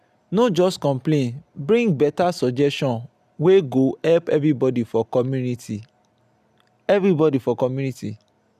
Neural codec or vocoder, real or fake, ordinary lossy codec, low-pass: none; real; none; 14.4 kHz